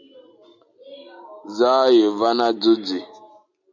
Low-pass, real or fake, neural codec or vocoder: 7.2 kHz; real; none